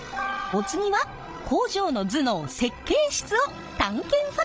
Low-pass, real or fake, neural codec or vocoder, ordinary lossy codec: none; fake; codec, 16 kHz, 16 kbps, FreqCodec, larger model; none